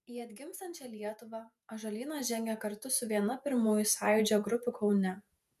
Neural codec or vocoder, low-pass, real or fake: vocoder, 48 kHz, 128 mel bands, Vocos; 14.4 kHz; fake